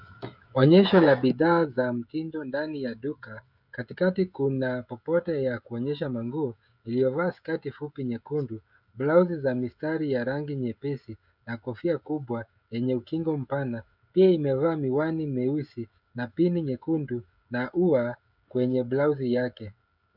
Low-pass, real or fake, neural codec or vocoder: 5.4 kHz; fake; codec, 16 kHz, 16 kbps, FreqCodec, smaller model